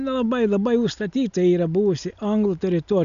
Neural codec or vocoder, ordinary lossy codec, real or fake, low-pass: none; MP3, 96 kbps; real; 7.2 kHz